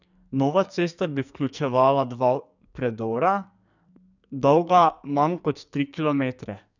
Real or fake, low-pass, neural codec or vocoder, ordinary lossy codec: fake; 7.2 kHz; codec, 44.1 kHz, 2.6 kbps, SNAC; none